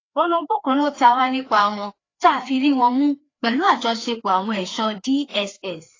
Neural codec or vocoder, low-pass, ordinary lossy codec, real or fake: codec, 16 kHz, 2 kbps, FreqCodec, larger model; 7.2 kHz; AAC, 32 kbps; fake